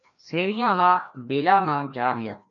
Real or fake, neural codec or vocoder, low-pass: fake; codec, 16 kHz, 1 kbps, FreqCodec, larger model; 7.2 kHz